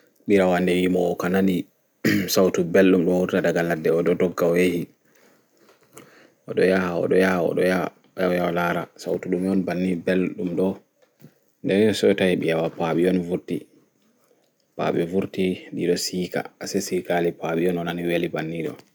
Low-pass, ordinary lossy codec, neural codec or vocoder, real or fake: none; none; none; real